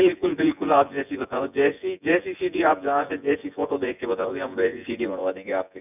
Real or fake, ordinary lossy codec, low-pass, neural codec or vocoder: fake; none; 3.6 kHz; vocoder, 24 kHz, 100 mel bands, Vocos